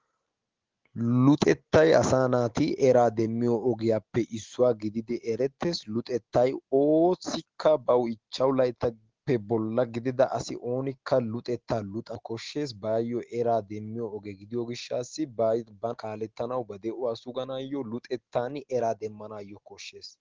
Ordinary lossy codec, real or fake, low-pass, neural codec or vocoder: Opus, 16 kbps; real; 7.2 kHz; none